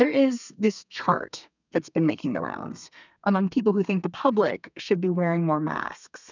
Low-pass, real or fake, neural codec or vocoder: 7.2 kHz; fake; codec, 32 kHz, 1.9 kbps, SNAC